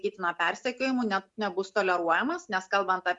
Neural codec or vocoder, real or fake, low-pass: none; real; 10.8 kHz